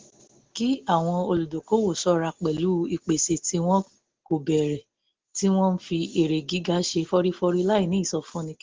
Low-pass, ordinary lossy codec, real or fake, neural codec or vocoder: 7.2 kHz; Opus, 16 kbps; real; none